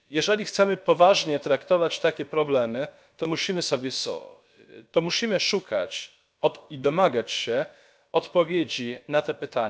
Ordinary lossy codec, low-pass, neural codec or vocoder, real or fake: none; none; codec, 16 kHz, about 1 kbps, DyCAST, with the encoder's durations; fake